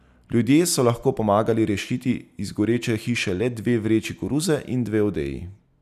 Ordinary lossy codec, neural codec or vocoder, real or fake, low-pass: none; none; real; 14.4 kHz